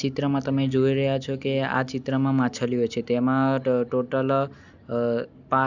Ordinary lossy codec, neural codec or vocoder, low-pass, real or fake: none; none; 7.2 kHz; real